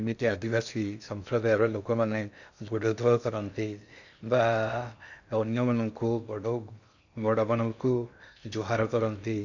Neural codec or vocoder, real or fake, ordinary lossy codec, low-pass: codec, 16 kHz in and 24 kHz out, 0.6 kbps, FocalCodec, streaming, 2048 codes; fake; none; 7.2 kHz